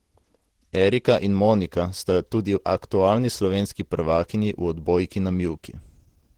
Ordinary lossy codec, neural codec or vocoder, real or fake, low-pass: Opus, 16 kbps; vocoder, 48 kHz, 128 mel bands, Vocos; fake; 19.8 kHz